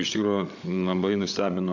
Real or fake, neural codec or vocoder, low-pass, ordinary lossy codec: fake; codec, 16 kHz, 8 kbps, FreqCodec, larger model; 7.2 kHz; AAC, 48 kbps